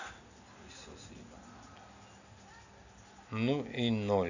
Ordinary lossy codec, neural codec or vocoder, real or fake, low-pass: none; none; real; 7.2 kHz